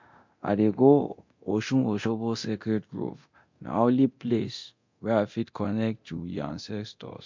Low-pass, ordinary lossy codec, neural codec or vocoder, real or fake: 7.2 kHz; MP3, 48 kbps; codec, 24 kHz, 0.9 kbps, DualCodec; fake